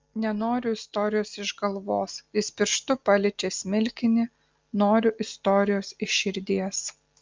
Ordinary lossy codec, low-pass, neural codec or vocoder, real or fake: Opus, 24 kbps; 7.2 kHz; none; real